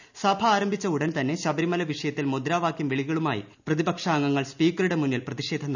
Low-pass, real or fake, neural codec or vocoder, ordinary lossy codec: 7.2 kHz; real; none; none